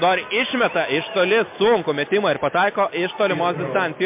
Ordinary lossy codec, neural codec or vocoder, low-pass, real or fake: MP3, 32 kbps; none; 3.6 kHz; real